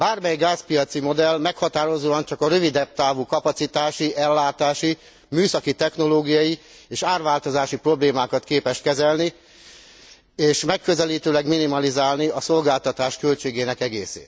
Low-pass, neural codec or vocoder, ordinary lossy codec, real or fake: none; none; none; real